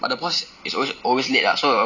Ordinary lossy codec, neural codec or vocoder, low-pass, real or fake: none; none; 7.2 kHz; real